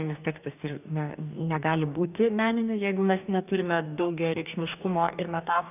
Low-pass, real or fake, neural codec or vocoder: 3.6 kHz; fake; codec, 44.1 kHz, 2.6 kbps, DAC